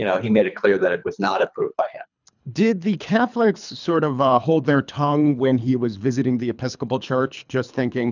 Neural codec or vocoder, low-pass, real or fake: codec, 24 kHz, 3 kbps, HILCodec; 7.2 kHz; fake